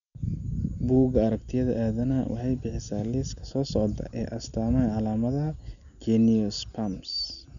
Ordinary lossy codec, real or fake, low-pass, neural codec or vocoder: none; real; 7.2 kHz; none